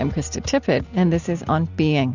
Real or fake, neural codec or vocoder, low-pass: real; none; 7.2 kHz